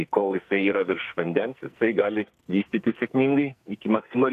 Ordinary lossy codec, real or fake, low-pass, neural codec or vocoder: AAC, 96 kbps; fake; 14.4 kHz; codec, 44.1 kHz, 2.6 kbps, SNAC